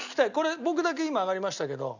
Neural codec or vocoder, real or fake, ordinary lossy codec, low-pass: none; real; none; 7.2 kHz